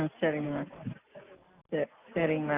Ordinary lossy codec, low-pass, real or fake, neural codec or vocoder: Opus, 64 kbps; 3.6 kHz; real; none